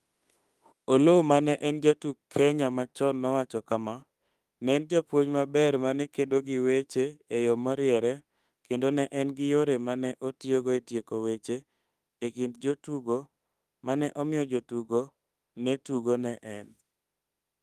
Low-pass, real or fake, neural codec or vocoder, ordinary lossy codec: 14.4 kHz; fake; autoencoder, 48 kHz, 32 numbers a frame, DAC-VAE, trained on Japanese speech; Opus, 32 kbps